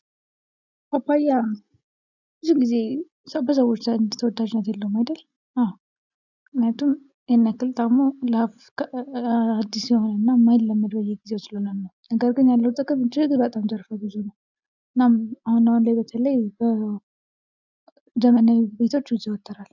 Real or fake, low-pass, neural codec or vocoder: real; 7.2 kHz; none